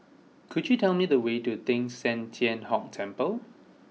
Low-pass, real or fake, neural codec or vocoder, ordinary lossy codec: none; real; none; none